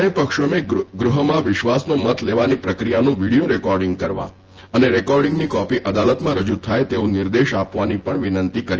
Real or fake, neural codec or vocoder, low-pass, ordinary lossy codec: fake; vocoder, 24 kHz, 100 mel bands, Vocos; 7.2 kHz; Opus, 16 kbps